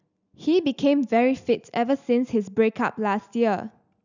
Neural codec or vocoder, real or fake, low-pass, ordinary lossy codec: none; real; 7.2 kHz; none